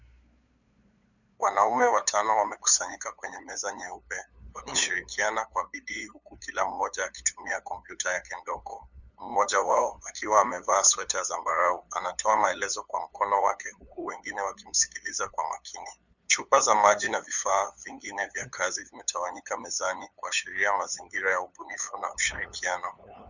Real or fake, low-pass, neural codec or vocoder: fake; 7.2 kHz; codec, 16 kHz, 8 kbps, FunCodec, trained on LibriTTS, 25 frames a second